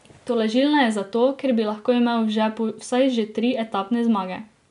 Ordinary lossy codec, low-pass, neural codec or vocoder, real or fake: none; 10.8 kHz; none; real